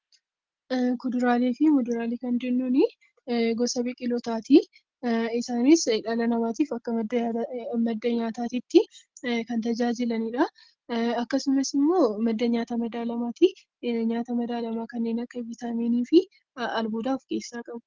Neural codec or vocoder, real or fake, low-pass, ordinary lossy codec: none; real; 7.2 kHz; Opus, 16 kbps